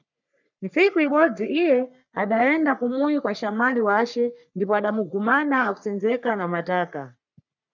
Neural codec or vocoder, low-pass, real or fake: codec, 44.1 kHz, 3.4 kbps, Pupu-Codec; 7.2 kHz; fake